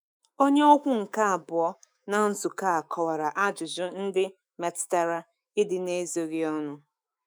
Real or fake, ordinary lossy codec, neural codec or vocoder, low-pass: fake; none; autoencoder, 48 kHz, 128 numbers a frame, DAC-VAE, trained on Japanese speech; none